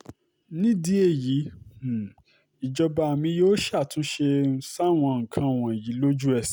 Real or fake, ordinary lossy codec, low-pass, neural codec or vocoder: real; none; none; none